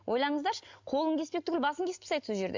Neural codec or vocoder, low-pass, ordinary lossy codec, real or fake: none; 7.2 kHz; MP3, 64 kbps; real